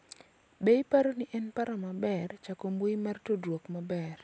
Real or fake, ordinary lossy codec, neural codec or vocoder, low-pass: real; none; none; none